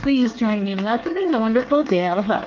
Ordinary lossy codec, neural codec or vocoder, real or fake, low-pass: Opus, 32 kbps; codec, 24 kHz, 1 kbps, SNAC; fake; 7.2 kHz